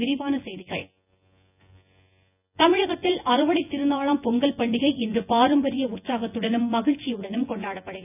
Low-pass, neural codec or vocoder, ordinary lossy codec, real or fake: 3.6 kHz; vocoder, 24 kHz, 100 mel bands, Vocos; none; fake